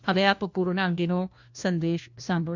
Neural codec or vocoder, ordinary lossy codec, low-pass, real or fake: codec, 16 kHz, 1 kbps, FunCodec, trained on LibriTTS, 50 frames a second; MP3, 64 kbps; 7.2 kHz; fake